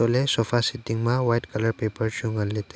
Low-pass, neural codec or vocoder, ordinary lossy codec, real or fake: none; none; none; real